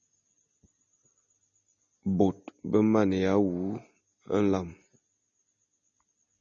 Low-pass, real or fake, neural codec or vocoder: 7.2 kHz; real; none